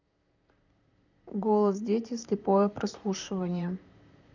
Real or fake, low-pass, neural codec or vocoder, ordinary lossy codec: fake; 7.2 kHz; vocoder, 44.1 kHz, 128 mel bands, Pupu-Vocoder; none